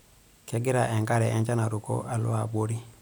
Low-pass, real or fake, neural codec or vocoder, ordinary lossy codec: none; real; none; none